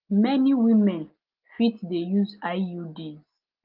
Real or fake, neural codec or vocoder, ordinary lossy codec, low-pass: real; none; Opus, 32 kbps; 5.4 kHz